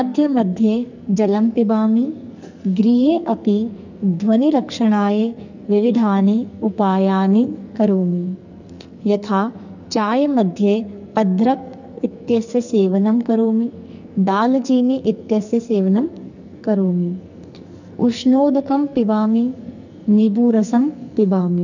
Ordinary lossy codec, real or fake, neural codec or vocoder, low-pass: none; fake; codec, 44.1 kHz, 2.6 kbps, SNAC; 7.2 kHz